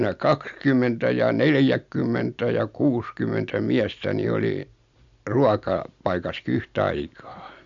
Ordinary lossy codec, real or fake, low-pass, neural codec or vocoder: MP3, 64 kbps; real; 7.2 kHz; none